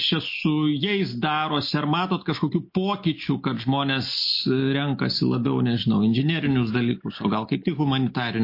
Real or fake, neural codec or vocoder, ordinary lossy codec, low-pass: real; none; MP3, 32 kbps; 5.4 kHz